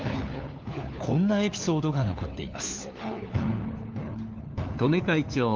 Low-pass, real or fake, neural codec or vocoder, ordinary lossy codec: 7.2 kHz; fake; codec, 16 kHz, 4 kbps, FunCodec, trained on LibriTTS, 50 frames a second; Opus, 24 kbps